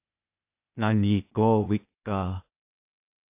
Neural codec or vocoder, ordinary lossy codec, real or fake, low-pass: codec, 16 kHz, 0.8 kbps, ZipCodec; AAC, 24 kbps; fake; 3.6 kHz